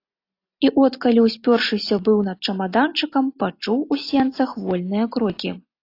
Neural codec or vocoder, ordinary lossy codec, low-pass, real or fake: none; AAC, 32 kbps; 5.4 kHz; real